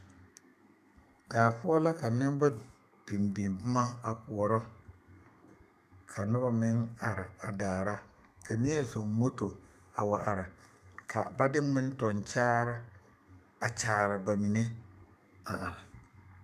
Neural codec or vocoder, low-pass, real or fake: codec, 32 kHz, 1.9 kbps, SNAC; 14.4 kHz; fake